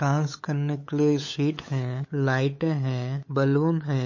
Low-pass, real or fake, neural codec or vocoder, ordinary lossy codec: 7.2 kHz; fake; codec, 16 kHz, 8 kbps, FunCodec, trained on LibriTTS, 25 frames a second; MP3, 32 kbps